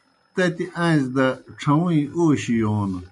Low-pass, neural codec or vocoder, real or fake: 10.8 kHz; none; real